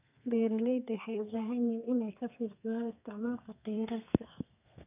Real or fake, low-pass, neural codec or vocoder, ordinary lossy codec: fake; 3.6 kHz; codec, 32 kHz, 1.9 kbps, SNAC; none